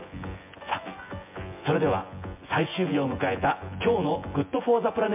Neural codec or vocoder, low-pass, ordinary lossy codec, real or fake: vocoder, 24 kHz, 100 mel bands, Vocos; 3.6 kHz; MP3, 32 kbps; fake